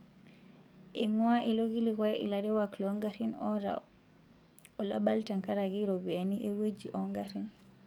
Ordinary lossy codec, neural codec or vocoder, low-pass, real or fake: none; codec, 44.1 kHz, 7.8 kbps, DAC; 19.8 kHz; fake